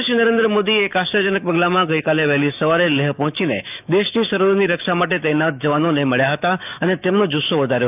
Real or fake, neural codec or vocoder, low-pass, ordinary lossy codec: fake; autoencoder, 48 kHz, 128 numbers a frame, DAC-VAE, trained on Japanese speech; 3.6 kHz; none